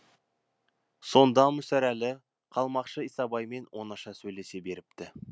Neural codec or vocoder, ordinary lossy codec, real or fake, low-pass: none; none; real; none